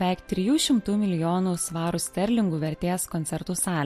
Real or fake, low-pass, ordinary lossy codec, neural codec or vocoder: real; 14.4 kHz; MP3, 64 kbps; none